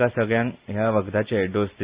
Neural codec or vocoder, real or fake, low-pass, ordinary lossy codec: none; real; 3.6 kHz; Opus, 64 kbps